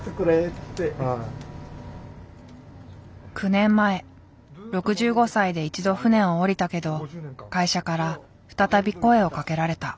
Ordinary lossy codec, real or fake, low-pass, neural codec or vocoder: none; real; none; none